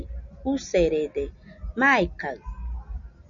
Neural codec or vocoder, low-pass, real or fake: none; 7.2 kHz; real